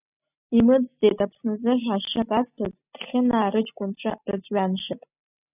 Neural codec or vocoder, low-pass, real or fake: none; 3.6 kHz; real